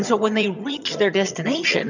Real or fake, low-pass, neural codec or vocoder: fake; 7.2 kHz; vocoder, 22.05 kHz, 80 mel bands, HiFi-GAN